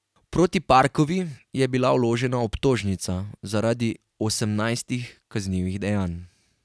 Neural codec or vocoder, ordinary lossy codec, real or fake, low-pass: none; none; real; none